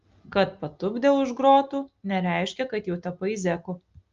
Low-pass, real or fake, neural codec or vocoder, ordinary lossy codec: 7.2 kHz; real; none; Opus, 32 kbps